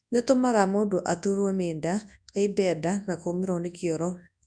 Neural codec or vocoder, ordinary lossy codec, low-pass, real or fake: codec, 24 kHz, 0.9 kbps, WavTokenizer, large speech release; none; 9.9 kHz; fake